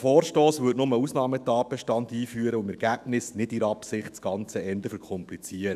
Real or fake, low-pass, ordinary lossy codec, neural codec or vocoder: fake; 14.4 kHz; none; autoencoder, 48 kHz, 128 numbers a frame, DAC-VAE, trained on Japanese speech